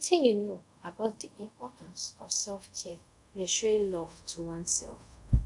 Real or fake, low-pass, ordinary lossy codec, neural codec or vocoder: fake; 10.8 kHz; none; codec, 24 kHz, 0.5 kbps, DualCodec